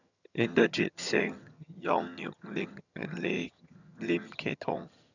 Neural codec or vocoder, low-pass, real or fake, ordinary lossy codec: vocoder, 22.05 kHz, 80 mel bands, HiFi-GAN; 7.2 kHz; fake; none